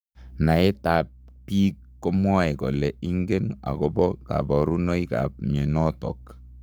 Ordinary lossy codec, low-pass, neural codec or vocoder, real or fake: none; none; codec, 44.1 kHz, 7.8 kbps, Pupu-Codec; fake